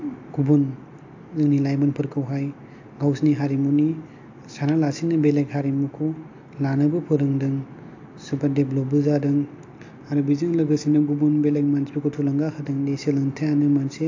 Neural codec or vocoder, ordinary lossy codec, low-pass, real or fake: none; AAC, 48 kbps; 7.2 kHz; real